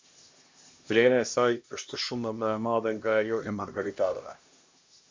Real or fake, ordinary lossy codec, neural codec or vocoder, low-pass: fake; MP3, 48 kbps; codec, 16 kHz, 1 kbps, X-Codec, HuBERT features, trained on LibriSpeech; 7.2 kHz